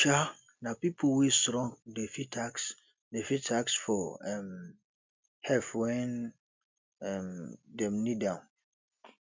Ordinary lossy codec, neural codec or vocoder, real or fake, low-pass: MP3, 64 kbps; none; real; 7.2 kHz